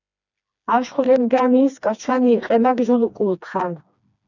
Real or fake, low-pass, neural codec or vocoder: fake; 7.2 kHz; codec, 16 kHz, 2 kbps, FreqCodec, smaller model